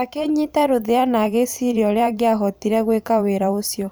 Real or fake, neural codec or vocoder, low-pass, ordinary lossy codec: fake; vocoder, 44.1 kHz, 128 mel bands every 512 samples, BigVGAN v2; none; none